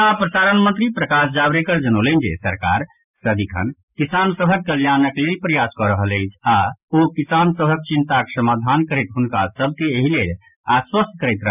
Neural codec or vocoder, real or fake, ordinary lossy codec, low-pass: none; real; none; 3.6 kHz